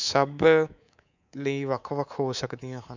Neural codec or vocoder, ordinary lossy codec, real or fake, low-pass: codec, 24 kHz, 3.1 kbps, DualCodec; none; fake; 7.2 kHz